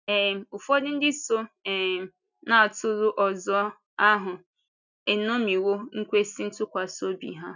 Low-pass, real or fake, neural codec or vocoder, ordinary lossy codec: 7.2 kHz; real; none; none